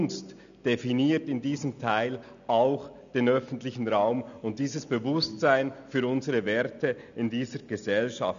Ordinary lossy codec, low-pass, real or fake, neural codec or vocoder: none; 7.2 kHz; real; none